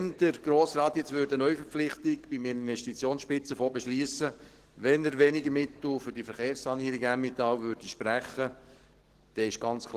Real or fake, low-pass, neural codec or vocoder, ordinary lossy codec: fake; 14.4 kHz; codec, 44.1 kHz, 7.8 kbps, DAC; Opus, 16 kbps